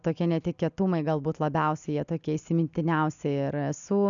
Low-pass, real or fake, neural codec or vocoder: 7.2 kHz; real; none